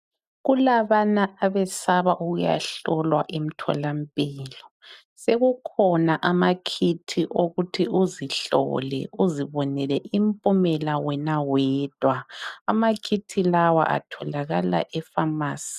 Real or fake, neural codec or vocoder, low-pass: real; none; 14.4 kHz